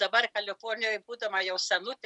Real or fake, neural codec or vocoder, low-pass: real; none; 10.8 kHz